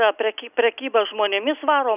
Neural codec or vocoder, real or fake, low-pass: none; real; 3.6 kHz